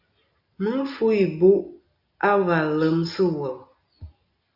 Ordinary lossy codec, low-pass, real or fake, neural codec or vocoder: AAC, 48 kbps; 5.4 kHz; real; none